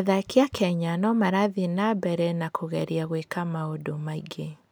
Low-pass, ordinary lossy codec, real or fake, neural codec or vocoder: none; none; real; none